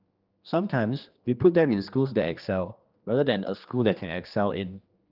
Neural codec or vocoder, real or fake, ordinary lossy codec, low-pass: codec, 16 kHz, 2 kbps, X-Codec, HuBERT features, trained on general audio; fake; Opus, 32 kbps; 5.4 kHz